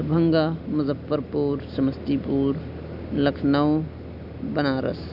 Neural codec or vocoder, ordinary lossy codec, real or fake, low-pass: none; none; real; 5.4 kHz